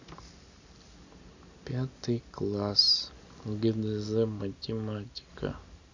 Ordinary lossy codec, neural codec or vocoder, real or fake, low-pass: AAC, 48 kbps; none; real; 7.2 kHz